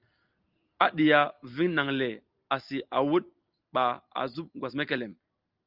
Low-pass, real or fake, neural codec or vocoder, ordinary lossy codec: 5.4 kHz; real; none; Opus, 24 kbps